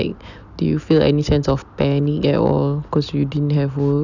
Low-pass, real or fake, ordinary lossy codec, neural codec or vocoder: 7.2 kHz; real; none; none